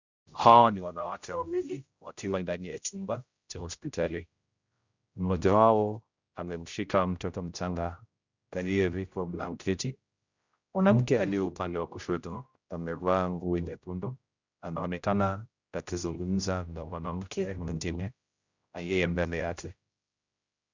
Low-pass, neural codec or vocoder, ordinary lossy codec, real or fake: 7.2 kHz; codec, 16 kHz, 0.5 kbps, X-Codec, HuBERT features, trained on general audio; Opus, 64 kbps; fake